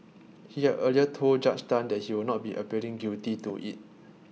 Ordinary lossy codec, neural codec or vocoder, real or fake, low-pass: none; none; real; none